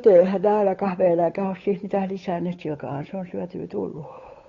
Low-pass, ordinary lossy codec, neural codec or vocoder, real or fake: 7.2 kHz; AAC, 32 kbps; codec, 16 kHz, 8 kbps, FunCodec, trained on Chinese and English, 25 frames a second; fake